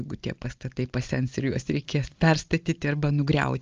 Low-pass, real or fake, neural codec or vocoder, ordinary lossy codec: 7.2 kHz; real; none; Opus, 24 kbps